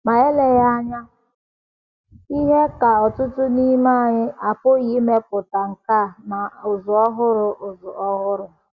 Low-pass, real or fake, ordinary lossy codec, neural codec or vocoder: 7.2 kHz; real; Opus, 64 kbps; none